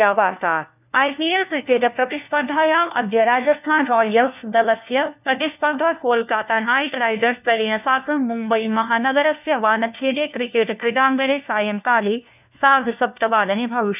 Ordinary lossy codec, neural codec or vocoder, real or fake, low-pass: none; codec, 16 kHz, 1 kbps, FunCodec, trained on LibriTTS, 50 frames a second; fake; 3.6 kHz